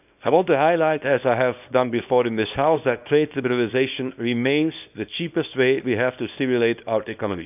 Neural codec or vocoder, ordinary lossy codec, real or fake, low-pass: codec, 24 kHz, 0.9 kbps, WavTokenizer, small release; none; fake; 3.6 kHz